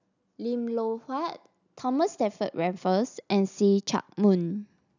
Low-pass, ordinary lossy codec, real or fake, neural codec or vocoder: 7.2 kHz; none; real; none